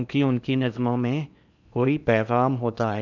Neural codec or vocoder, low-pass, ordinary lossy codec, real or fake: codec, 16 kHz in and 24 kHz out, 0.8 kbps, FocalCodec, streaming, 65536 codes; 7.2 kHz; none; fake